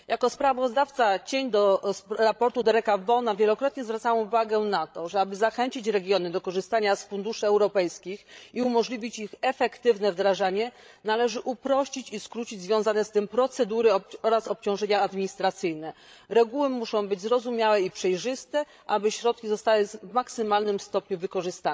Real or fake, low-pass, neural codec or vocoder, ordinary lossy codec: fake; none; codec, 16 kHz, 16 kbps, FreqCodec, larger model; none